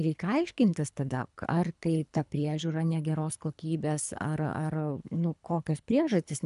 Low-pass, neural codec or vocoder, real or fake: 10.8 kHz; codec, 24 kHz, 3 kbps, HILCodec; fake